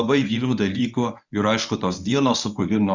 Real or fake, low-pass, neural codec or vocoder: fake; 7.2 kHz; codec, 24 kHz, 0.9 kbps, WavTokenizer, medium speech release version 1